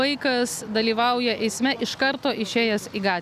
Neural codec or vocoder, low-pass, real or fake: none; 14.4 kHz; real